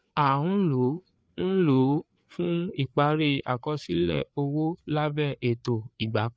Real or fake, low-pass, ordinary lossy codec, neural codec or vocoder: fake; none; none; codec, 16 kHz, 4 kbps, FreqCodec, larger model